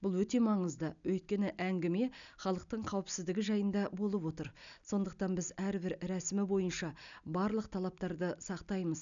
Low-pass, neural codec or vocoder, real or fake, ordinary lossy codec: 7.2 kHz; none; real; none